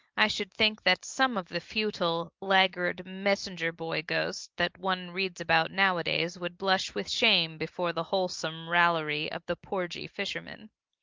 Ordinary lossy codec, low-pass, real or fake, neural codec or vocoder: Opus, 32 kbps; 7.2 kHz; real; none